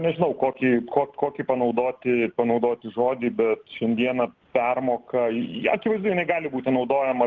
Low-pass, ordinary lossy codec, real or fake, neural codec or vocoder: 7.2 kHz; Opus, 16 kbps; real; none